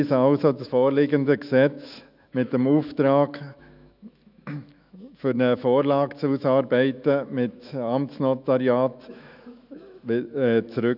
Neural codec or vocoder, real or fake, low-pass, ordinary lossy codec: autoencoder, 48 kHz, 128 numbers a frame, DAC-VAE, trained on Japanese speech; fake; 5.4 kHz; none